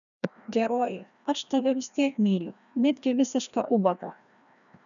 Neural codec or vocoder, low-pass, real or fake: codec, 16 kHz, 1 kbps, FreqCodec, larger model; 7.2 kHz; fake